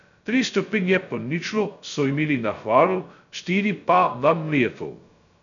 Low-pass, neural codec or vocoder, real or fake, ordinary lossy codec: 7.2 kHz; codec, 16 kHz, 0.2 kbps, FocalCodec; fake; none